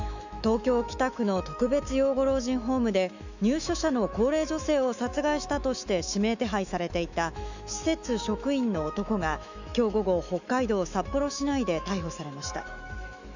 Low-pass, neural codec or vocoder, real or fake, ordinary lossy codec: 7.2 kHz; autoencoder, 48 kHz, 128 numbers a frame, DAC-VAE, trained on Japanese speech; fake; none